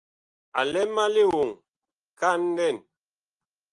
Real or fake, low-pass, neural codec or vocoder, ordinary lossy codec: real; 10.8 kHz; none; Opus, 32 kbps